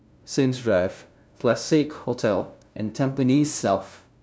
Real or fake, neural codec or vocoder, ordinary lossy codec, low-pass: fake; codec, 16 kHz, 0.5 kbps, FunCodec, trained on LibriTTS, 25 frames a second; none; none